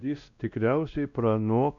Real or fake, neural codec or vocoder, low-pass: fake; codec, 16 kHz, 1 kbps, X-Codec, WavLM features, trained on Multilingual LibriSpeech; 7.2 kHz